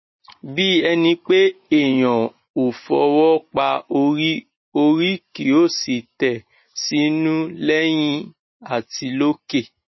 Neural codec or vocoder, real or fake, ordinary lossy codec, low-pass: none; real; MP3, 24 kbps; 7.2 kHz